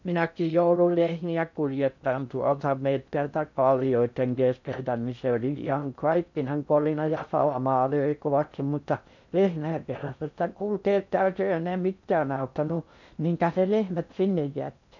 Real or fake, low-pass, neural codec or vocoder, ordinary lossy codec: fake; 7.2 kHz; codec, 16 kHz in and 24 kHz out, 0.6 kbps, FocalCodec, streaming, 2048 codes; none